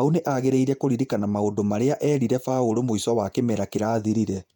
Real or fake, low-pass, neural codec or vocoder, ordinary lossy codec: fake; 19.8 kHz; vocoder, 48 kHz, 128 mel bands, Vocos; none